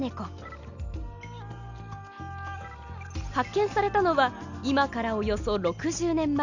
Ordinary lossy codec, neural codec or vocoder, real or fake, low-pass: none; none; real; 7.2 kHz